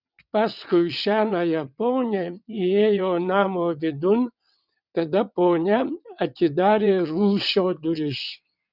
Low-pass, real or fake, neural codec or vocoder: 5.4 kHz; fake; vocoder, 22.05 kHz, 80 mel bands, WaveNeXt